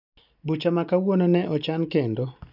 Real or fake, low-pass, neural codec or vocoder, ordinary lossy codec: real; 5.4 kHz; none; none